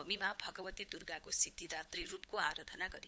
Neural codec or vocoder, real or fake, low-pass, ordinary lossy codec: codec, 16 kHz, 8 kbps, FunCodec, trained on LibriTTS, 25 frames a second; fake; none; none